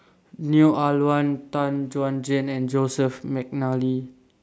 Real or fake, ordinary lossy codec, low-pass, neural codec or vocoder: real; none; none; none